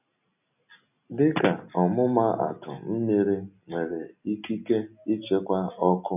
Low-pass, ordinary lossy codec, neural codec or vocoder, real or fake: 3.6 kHz; none; none; real